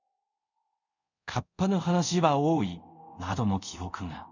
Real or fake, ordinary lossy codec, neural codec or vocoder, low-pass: fake; none; codec, 24 kHz, 0.5 kbps, DualCodec; 7.2 kHz